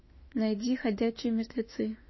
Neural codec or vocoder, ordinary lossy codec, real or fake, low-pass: autoencoder, 48 kHz, 32 numbers a frame, DAC-VAE, trained on Japanese speech; MP3, 24 kbps; fake; 7.2 kHz